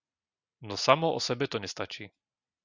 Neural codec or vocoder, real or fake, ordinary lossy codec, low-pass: none; real; Opus, 64 kbps; 7.2 kHz